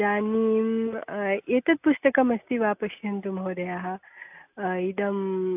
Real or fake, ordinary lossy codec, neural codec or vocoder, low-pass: real; AAC, 32 kbps; none; 3.6 kHz